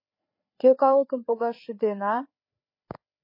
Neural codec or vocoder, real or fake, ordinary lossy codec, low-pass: codec, 16 kHz, 4 kbps, FreqCodec, larger model; fake; MP3, 24 kbps; 5.4 kHz